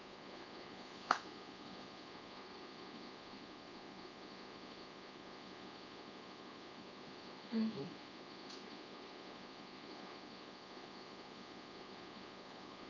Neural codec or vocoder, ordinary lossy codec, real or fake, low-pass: codec, 24 kHz, 1.2 kbps, DualCodec; none; fake; 7.2 kHz